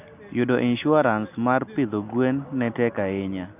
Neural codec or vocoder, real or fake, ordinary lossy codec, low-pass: none; real; none; 3.6 kHz